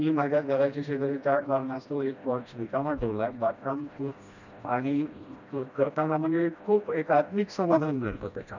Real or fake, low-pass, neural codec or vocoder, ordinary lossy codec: fake; 7.2 kHz; codec, 16 kHz, 1 kbps, FreqCodec, smaller model; none